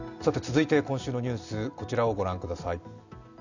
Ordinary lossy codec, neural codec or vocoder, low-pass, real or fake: none; none; 7.2 kHz; real